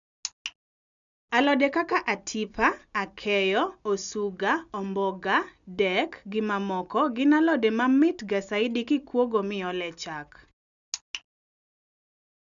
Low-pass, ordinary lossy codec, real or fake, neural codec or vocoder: 7.2 kHz; none; real; none